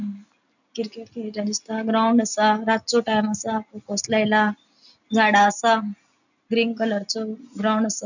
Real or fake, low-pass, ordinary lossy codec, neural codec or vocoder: real; 7.2 kHz; none; none